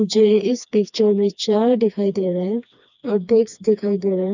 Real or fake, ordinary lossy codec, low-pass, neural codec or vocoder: fake; none; 7.2 kHz; codec, 16 kHz, 2 kbps, FreqCodec, smaller model